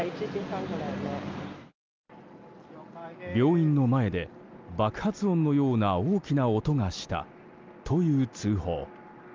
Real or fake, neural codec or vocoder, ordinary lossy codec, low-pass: real; none; Opus, 24 kbps; 7.2 kHz